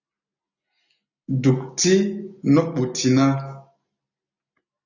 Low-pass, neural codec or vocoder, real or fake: 7.2 kHz; none; real